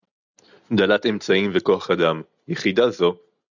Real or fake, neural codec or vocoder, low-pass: real; none; 7.2 kHz